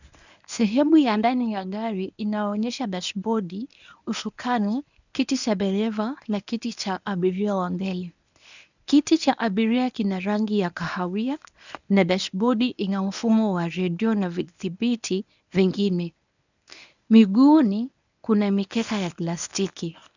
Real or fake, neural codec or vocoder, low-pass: fake; codec, 24 kHz, 0.9 kbps, WavTokenizer, medium speech release version 1; 7.2 kHz